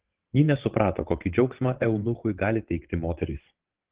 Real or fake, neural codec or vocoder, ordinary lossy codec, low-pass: fake; vocoder, 22.05 kHz, 80 mel bands, WaveNeXt; Opus, 16 kbps; 3.6 kHz